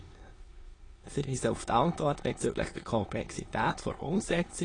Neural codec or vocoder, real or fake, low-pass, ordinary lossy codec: autoencoder, 22.05 kHz, a latent of 192 numbers a frame, VITS, trained on many speakers; fake; 9.9 kHz; AAC, 32 kbps